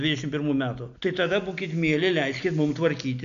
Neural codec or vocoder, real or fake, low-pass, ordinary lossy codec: none; real; 7.2 kHz; AAC, 96 kbps